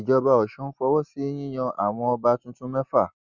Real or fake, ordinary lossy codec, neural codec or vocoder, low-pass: real; Opus, 64 kbps; none; 7.2 kHz